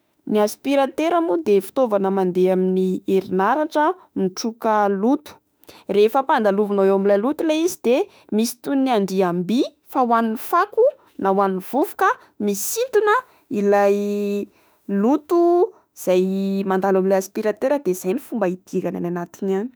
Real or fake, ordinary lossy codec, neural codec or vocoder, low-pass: fake; none; autoencoder, 48 kHz, 32 numbers a frame, DAC-VAE, trained on Japanese speech; none